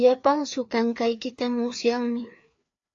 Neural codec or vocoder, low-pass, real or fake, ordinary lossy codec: codec, 16 kHz, 2 kbps, FreqCodec, larger model; 7.2 kHz; fake; AAC, 48 kbps